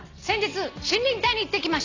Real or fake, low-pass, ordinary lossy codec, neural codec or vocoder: real; 7.2 kHz; none; none